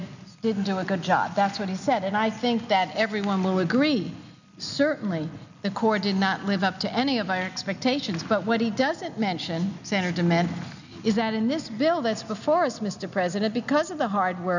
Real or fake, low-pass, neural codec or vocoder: real; 7.2 kHz; none